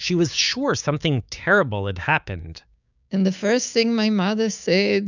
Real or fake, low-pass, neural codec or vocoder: fake; 7.2 kHz; codec, 16 kHz, 6 kbps, DAC